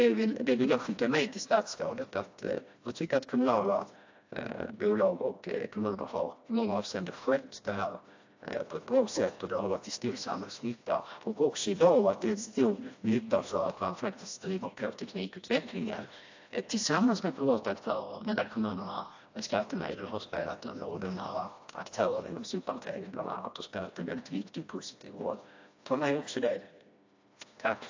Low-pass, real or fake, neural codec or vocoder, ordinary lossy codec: 7.2 kHz; fake; codec, 16 kHz, 1 kbps, FreqCodec, smaller model; AAC, 48 kbps